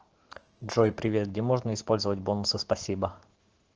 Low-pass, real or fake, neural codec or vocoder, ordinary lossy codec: 7.2 kHz; fake; autoencoder, 48 kHz, 128 numbers a frame, DAC-VAE, trained on Japanese speech; Opus, 16 kbps